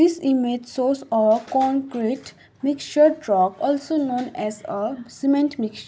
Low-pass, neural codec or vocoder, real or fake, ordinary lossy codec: none; none; real; none